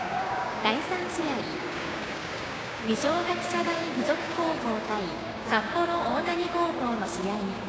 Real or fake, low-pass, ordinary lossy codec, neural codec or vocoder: fake; none; none; codec, 16 kHz, 6 kbps, DAC